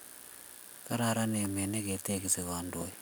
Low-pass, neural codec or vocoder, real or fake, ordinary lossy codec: none; none; real; none